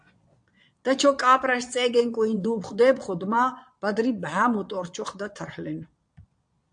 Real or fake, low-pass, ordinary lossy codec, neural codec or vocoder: fake; 9.9 kHz; AAC, 64 kbps; vocoder, 22.05 kHz, 80 mel bands, Vocos